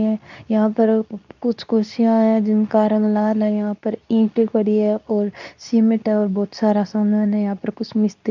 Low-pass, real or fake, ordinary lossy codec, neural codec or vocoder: 7.2 kHz; fake; none; codec, 24 kHz, 0.9 kbps, WavTokenizer, medium speech release version 2